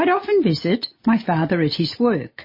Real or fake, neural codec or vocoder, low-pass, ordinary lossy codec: real; none; 5.4 kHz; MP3, 24 kbps